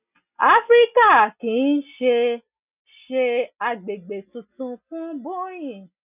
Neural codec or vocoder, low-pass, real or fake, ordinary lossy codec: none; 3.6 kHz; real; none